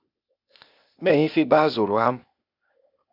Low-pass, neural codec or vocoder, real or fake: 5.4 kHz; codec, 16 kHz, 0.8 kbps, ZipCodec; fake